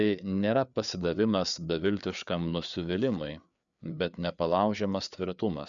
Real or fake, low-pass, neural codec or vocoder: fake; 7.2 kHz; codec, 16 kHz, 4 kbps, FreqCodec, larger model